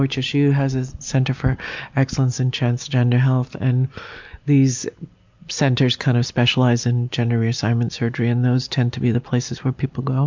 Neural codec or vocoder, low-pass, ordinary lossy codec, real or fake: none; 7.2 kHz; MP3, 64 kbps; real